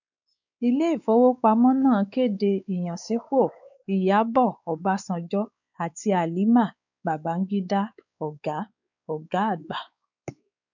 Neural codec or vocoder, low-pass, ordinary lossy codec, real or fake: codec, 16 kHz, 4 kbps, X-Codec, WavLM features, trained on Multilingual LibriSpeech; 7.2 kHz; none; fake